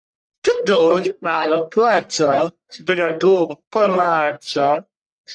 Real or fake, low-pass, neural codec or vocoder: fake; 9.9 kHz; codec, 44.1 kHz, 1.7 kbps, Pupu-Codec